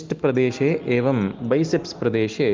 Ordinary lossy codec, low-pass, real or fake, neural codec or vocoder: Opus, 32 kbps; 7.2 kHz; real; none